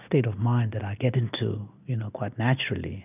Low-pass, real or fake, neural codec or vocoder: 3.6 kHz; real; none